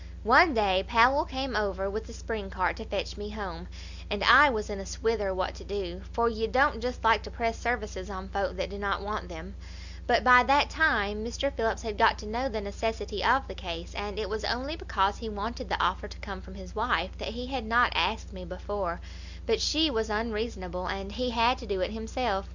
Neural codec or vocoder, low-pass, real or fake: none; 7.2 kHz; real